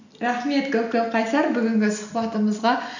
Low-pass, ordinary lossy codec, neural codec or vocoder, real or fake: 7.2 kHz; none; none; real